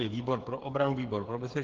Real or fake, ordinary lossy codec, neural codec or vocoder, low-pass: fake; Opus, 16 kbps; codec, 16 kHz, 8 kbps, FreqCodec, smaller model; 7.2 kHz